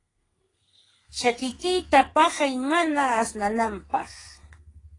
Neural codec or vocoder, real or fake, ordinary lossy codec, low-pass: codec, 32 kHz, 1.9 kbps, SNAC; fake; AAC, 32 kbps; 10.8 kHz